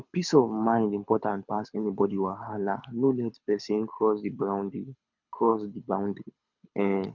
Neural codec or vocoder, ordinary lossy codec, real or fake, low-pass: codec, 24 kHz, 6 kbps, HILCodec; none; fake; 7.2 kHz